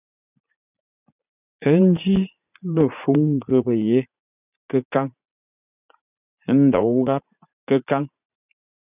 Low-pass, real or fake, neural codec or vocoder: 3.6 kHz; fake; vocoder, 44.1 kHz, 80 mel bands, Vocos